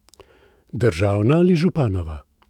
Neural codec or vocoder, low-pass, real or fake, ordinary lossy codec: autoencoder, 48 kHz, 128 numbers a frame, DAC-VAE, trained on Japanese speech; 19.8 kHz; fake; none